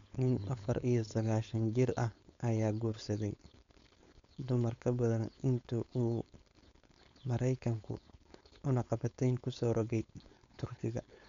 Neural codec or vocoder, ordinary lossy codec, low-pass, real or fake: codec, 16 kHz, 4.8 kbps, FACodec; MP3, 64 kbps; 7.2 kHz; fake